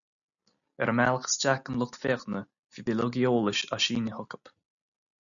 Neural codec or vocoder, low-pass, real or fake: none; 7.2 kHz; real